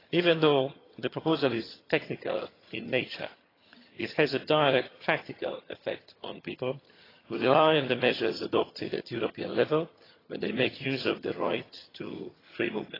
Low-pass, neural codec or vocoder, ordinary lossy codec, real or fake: 5.4 kHz; vocoder, 22.05 kHz, 80 mel bands, HiFi-GAN; AAC, 24 kbps; fake